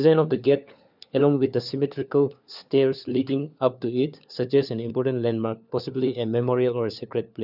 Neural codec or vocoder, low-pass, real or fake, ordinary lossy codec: codec, 16 kHz, 4 kbps, FunCodec, trained on LibriTTS, 50 frames a second; 5.4 kHz; fake; none